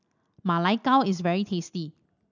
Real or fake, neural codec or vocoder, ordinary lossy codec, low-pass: real; none; none; 7.2 kHz